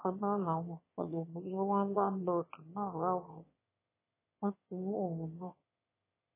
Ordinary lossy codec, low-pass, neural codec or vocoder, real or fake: MP3, 16 kbps; 3.6 kHz; autoencoder, 22.05 kHz, a latent of 192 numbers a frame, VITS, trained on one speaker; fake